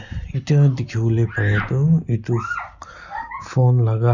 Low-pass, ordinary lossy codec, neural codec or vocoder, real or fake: 7.2 kHz; none; none; real